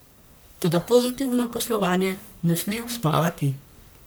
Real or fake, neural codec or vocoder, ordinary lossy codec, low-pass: fake; codec, 44.1 kHz, 1.7 kbps, Pupu-Codec; none; none